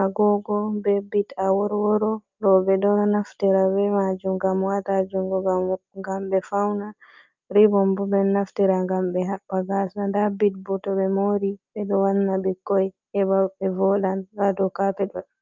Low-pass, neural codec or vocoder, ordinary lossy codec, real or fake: 7.2 kHz; none; Opus, 24 kbps; real